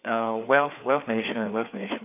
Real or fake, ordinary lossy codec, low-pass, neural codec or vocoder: fake; none; 3.6 kHz; codec, 16 kHz, 4 kbps, FreqCodec, larger model